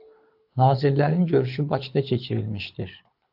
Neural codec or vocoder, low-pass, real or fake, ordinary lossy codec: codec, 24 kHz, 6 kbps, HILCodec; 5.4 kHz; fake; AAC, 48 kbps